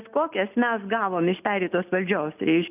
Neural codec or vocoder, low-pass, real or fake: none; 3.6 kHz; real